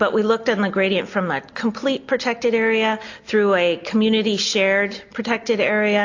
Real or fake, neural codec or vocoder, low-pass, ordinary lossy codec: real; none; 7.2 kHz; AAC, 48 kbps